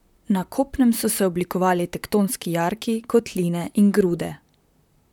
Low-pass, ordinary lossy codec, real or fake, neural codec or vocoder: 19.8 kHz; none; fake; vocoder, 44.1 kHz, 128 mel bands, Pupu-Vocoder